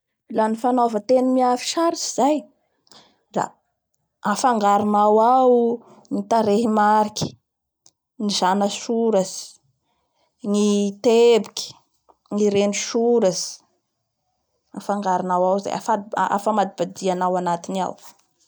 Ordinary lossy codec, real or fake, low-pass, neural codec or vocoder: none; real; none; none